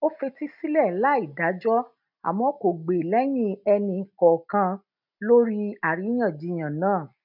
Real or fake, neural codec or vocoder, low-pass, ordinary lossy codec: real; none; 5.4 kHz; none